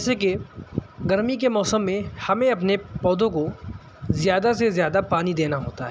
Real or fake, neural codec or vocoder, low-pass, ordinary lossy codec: real; none; none; none